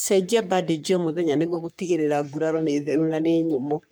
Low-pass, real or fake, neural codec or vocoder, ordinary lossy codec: none; fake; codec, 44.1 kHz, 3.4 kbps, Pupu-Codec; none